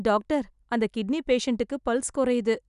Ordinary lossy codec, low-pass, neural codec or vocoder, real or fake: none; 10.8 kHz; none; real